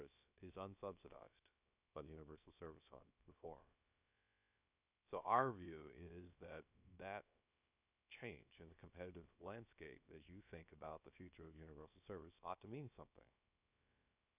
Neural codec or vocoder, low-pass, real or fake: codec, 16 kHz, about 1 kbps, DyCAST, with the encoder's durations; 3.6 kHz; fake